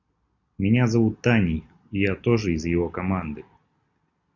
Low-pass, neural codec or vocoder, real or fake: 7.2 kHz; none; real